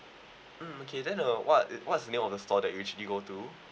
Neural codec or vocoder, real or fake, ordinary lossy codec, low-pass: none; real; none; none